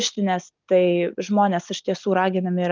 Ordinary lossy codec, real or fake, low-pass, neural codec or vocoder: Opus, 32 kbps; real; 7.2 kHz; none